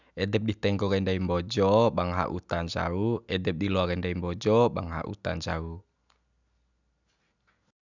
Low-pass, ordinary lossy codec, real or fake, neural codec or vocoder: 7.2 kHz; none; real; none